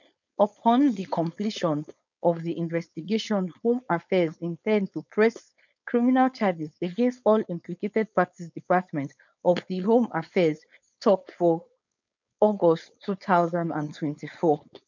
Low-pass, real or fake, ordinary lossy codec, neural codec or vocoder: 7.2 kHz; fake; none; codec, 16 kHz, 4.8 kbps, FACodec